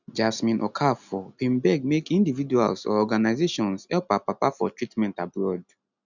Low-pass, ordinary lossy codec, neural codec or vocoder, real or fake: 7.2 kHz; none; none; real